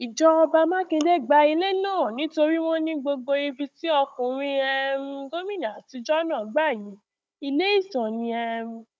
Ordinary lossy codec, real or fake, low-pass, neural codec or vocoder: none; fake; none; codec, 16 kHz, 16 kbps, FunCodec, trained on Chinese and English, 50 frames a second